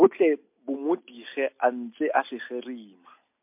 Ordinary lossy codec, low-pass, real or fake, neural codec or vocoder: MP3, 32 kbps; 3.6 kHz; real; none